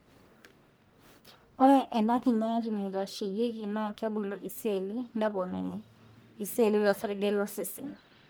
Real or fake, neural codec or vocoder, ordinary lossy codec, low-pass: fake; codec, 44.1 kHz, 1.7 kbps, Pupu-Codec; none; none